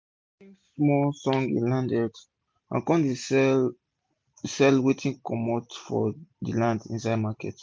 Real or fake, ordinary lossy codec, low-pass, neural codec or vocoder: real; none; none; none